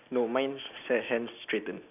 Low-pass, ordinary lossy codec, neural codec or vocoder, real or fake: 3.6 kHz; none; none; real